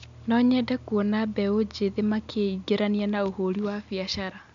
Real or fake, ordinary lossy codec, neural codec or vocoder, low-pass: real; MP3, 64 kbps; none; 7.2 kHz